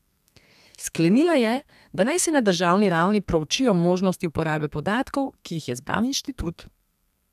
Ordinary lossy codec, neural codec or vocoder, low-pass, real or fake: none; codec, 32 kHz, 1.9 kbps, SNAC; 14.4 kHz; fake